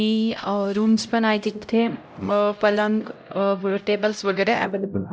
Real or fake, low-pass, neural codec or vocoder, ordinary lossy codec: fake; none; codec, 16 kHz, 0.5 kbps, X-Codec, HuBERT features, trained on LibriSpeech; none